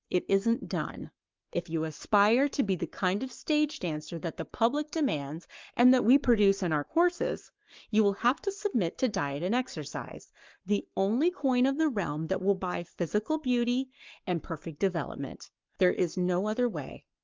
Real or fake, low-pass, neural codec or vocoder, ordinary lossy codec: fake; 7.2 kHz; codec, 44.1 kHz, 7.8 kbps, Pupu-Codec; Opus, 32 kbps